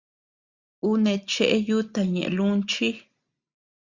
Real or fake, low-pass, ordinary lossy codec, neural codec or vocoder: real; 7.2 kHz; Opus, 64 kbps; none